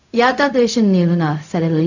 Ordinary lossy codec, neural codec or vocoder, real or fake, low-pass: none; codec, 16 kHz, 0.4 kbps, LongCat-Audio-Codec; fake; 7.2 kHz